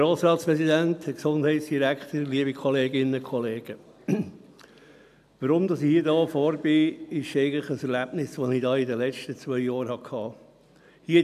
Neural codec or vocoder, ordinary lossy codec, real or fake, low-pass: none; none; real; 14.4 kHz